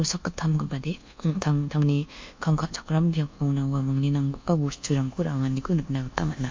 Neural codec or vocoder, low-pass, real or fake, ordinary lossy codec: codec, 24 kHz, 1.2 kbps, DualCodec; 7.2 kHz; fake; MP3, 48 kbps